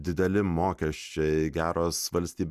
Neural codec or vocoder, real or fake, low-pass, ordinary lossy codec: none; real; 14.4 kHz; Opus, 64 kbps